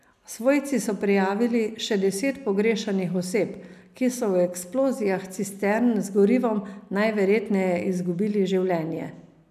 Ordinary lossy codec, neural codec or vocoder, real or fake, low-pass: none; none; real; 14.4 kHz